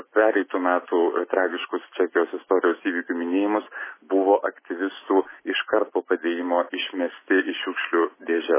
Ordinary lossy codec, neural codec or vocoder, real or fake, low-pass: MP3, 16 kbps; none; real; 3.6 kHz